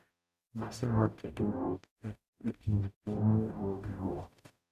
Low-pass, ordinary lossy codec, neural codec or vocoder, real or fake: 14.4 kHz; none; codec, 44.1 kHz, 0.9 kbps, DAC; fake